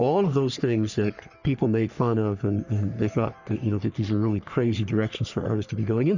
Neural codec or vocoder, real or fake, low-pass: codec, 44.1 kHz, 3.4 kbps, Pupu-Codec; fake; 7.2 kHz